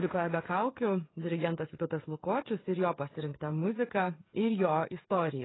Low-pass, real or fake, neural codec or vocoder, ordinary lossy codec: 7.2 kHz; fake; codec, 16 kHz, 16 kbps, FreqCodec, smaller model; AAC, 16 kbps